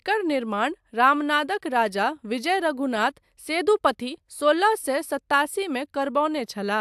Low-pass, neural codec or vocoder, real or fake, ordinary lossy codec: 14.4 kHz; none; real; AAC, 96 kbps